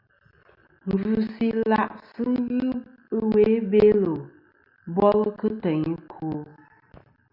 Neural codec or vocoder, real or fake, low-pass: none; real; 5.4 kHz